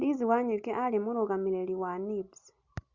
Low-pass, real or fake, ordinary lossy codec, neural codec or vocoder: 7.2 kHz; real; Opus, 64 kbps; none